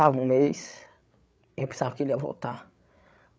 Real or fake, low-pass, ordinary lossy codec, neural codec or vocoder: fake; none; none; codec, 16 kHz, 8 kbps, FreqCodec, larger model